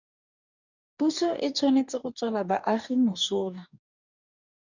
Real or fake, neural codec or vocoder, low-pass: fake; codec, 44.1 kHz, 2.6 kbps, DAC; 7.2 kHz